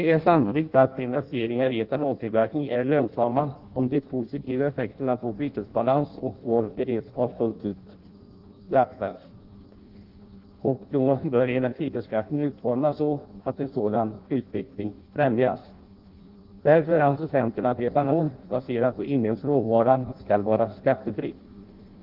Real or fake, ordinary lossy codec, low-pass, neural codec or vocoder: fake; Opus, 32 kbps; 5.4 kHz; codec, 16 kHz in and 24 kHz out, 0.6 kbps, FireRedTTS-2 codec